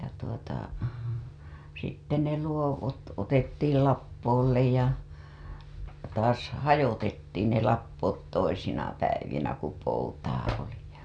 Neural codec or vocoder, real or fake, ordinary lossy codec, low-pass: none; real; none; 9.9 kHz